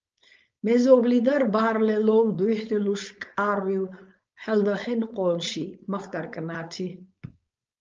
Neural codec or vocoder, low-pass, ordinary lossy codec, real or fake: codec, 16 kHz, 4.8 kbps, FACodec; 7.2 kHz; Opus, 24 kbps; fake